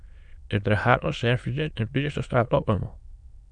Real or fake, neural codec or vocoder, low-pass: fake; autoencoder, 22.05 kHz, a latent of 192 numbers a frame, VITS, trained on many speakers; 9.9 kHz